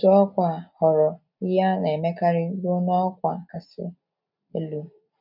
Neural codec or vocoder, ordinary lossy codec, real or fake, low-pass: none; none; real; 5.4 kHz